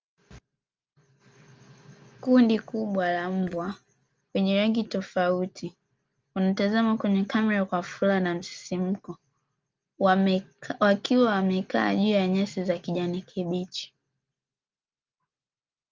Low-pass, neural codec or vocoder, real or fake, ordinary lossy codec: 7.2 kHz; none; real; Opus, 24 kbps